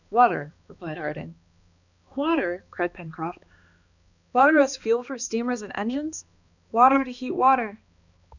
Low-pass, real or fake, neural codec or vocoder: 7.2 kHz; fake; codec, 16 kHz, 2 kbps, X-Codec, HuBERT features, trained on balanced general audio